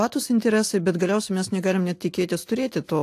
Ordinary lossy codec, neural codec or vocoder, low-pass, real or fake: AAC, 64 kbps; none; 14.4 kHz; real